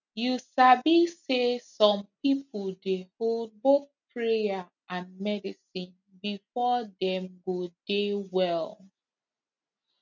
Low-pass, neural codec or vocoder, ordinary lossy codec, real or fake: 7.2 kHz; none; none; real